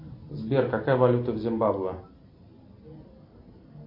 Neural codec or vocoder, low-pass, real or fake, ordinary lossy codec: none; 5.4 kHz; real; MP3, 32 kbps